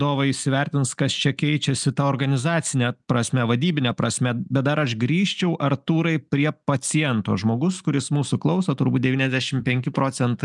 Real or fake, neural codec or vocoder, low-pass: real; none; 10.8 kHz